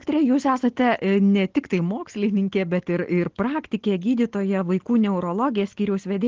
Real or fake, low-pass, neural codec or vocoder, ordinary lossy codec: real; 7.2 kHz; none; Opus, 16 kbps